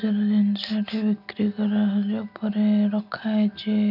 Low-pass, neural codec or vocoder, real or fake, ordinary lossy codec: 5.4 kHz; none; real; none